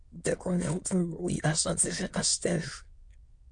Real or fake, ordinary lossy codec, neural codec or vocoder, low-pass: fake; MP3, 48 kbps; autoencoder, 22.05 kHz, a latent of 192 numbers a frame, VITS, trained on many speakers; 9.9 kHz